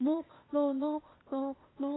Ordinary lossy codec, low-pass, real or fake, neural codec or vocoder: AAC, 16 kbps; 7.2 kHz; fake; codec, 16 kHz, 0.8 kbps, ZipCodec